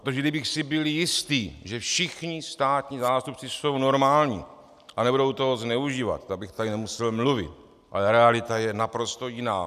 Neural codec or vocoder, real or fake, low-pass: none; real; 14.4 kHz